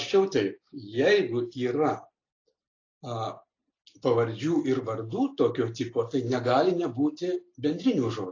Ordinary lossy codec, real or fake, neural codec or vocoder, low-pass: AAC, 32 kbps; real; none; 7.2 kHz